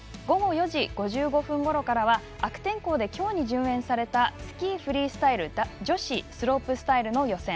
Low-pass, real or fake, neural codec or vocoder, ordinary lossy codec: none; real; none; none